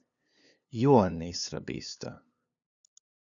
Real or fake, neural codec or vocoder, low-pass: fake; codec, 16 kHz, 2 kbps, FunCodec, trained on LibriTTS, 25 frames a second; 7.2 kHz